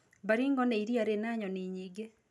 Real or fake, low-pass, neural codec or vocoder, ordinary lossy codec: real; none; none; none